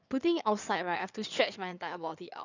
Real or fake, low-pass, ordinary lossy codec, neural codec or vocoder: fake; 7.2 kHz; none; codec, 16 kHz in and 24 kHz out, 2.2 kbps, FireRedTTS-2 codec